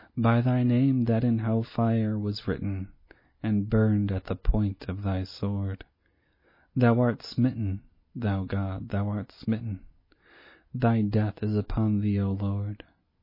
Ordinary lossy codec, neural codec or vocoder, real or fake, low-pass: MP3, 24 kbps; none; real; 5.4 kHz